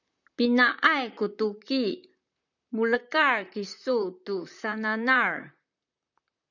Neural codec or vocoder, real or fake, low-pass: vocoder, 44.1 kHz, 128 mel bands, Pupu-Vocoder; fake; 7.2 kHz